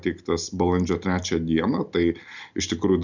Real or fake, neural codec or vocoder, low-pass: real; none; 7.2 kHz